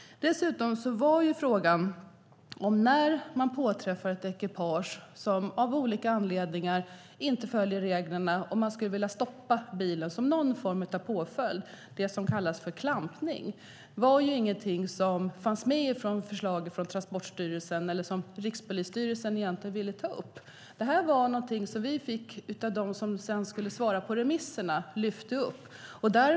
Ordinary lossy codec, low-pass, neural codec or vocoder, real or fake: none; none; none; real